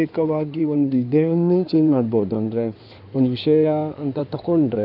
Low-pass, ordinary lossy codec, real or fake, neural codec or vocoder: 5.4 kHz; none; fake; codec, 16 kHz in and 24 kHz out, 2.2 kbps, FireRedTTS-2 codec